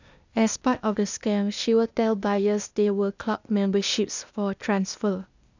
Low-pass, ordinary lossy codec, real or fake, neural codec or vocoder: 7.2 kHz; none; fake; codec, 16 kHz, 0.8 kbps, ZipCodec